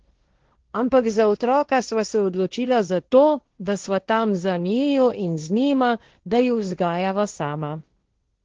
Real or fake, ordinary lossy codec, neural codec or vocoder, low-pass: fake; Opus, 16 kbps; codec, 16 kHz, 1.1 kbps, Voila-Tokenizer; 7.2 kHz